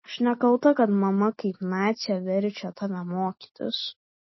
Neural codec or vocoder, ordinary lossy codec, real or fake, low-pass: none; MP3, 24 kbps; real; 7.2 kHz